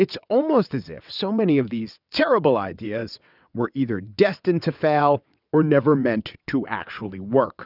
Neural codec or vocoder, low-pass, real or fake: vocoder, 22.05 kHz, 80 mel bands, WaveNeXt; 5.4 kHz; fake